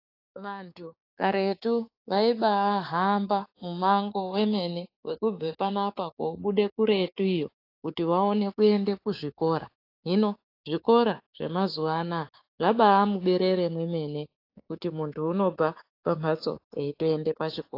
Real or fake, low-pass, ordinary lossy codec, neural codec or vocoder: fake; 5.4 kHz; AAC, 32 kbps; codec, 16 kHz, 6 kbps, DAC